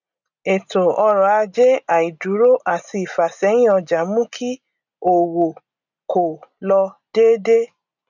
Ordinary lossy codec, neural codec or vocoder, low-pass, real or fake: none; none; 7.2 kHz; real